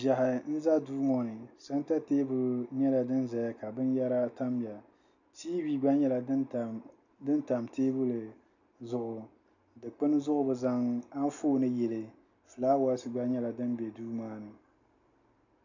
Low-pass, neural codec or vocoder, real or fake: 7.2 kHz; none; real